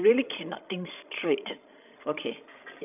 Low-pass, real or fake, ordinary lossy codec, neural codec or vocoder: 3.6 kHz; fake; none; codec, 16 kHz, 16 kbps, FreqCodec, larger model